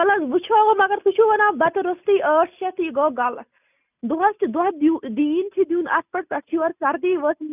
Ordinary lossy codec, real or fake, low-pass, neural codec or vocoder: none; real; 3.6 kHz; none